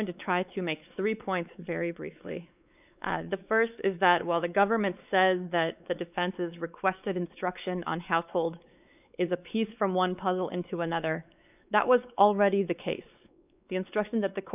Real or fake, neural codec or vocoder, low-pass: fake; codec, 16 kHz, 4 kbps, X-Codec, WavLM features, trained on Multilingual LibriSpeech; 3.6 kHz